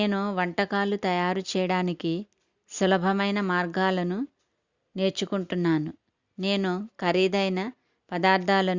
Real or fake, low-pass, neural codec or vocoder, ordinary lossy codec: real; 7.2 kHz; none; Opus, 64 kbps